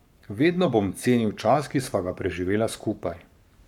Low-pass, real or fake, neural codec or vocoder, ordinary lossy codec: 19.8 kHz; fake; codec, 44.1 kHz, 7.8 kbps, Pupu-Codec; none